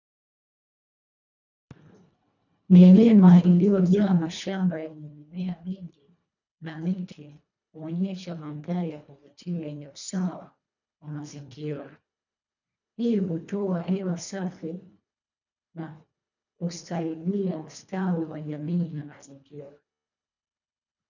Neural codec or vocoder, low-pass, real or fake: codec, 24 kHz, 1.5 kbps, HILCodec; 7.2 kHz; fake